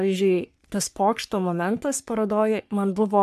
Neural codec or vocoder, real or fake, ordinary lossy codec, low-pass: codec, 44.1 kHz, 3.4 kbps, Pupu-Codec; fake; AAC, 96 kbps; 14.4 kHz